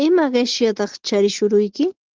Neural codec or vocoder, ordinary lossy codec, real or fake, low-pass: none; Opus, 16 kbps; real; 7.2 kHz